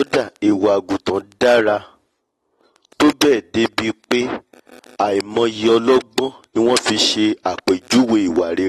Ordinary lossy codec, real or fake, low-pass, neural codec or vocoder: AAC, 32 kbps; real; 19.8 kHz; none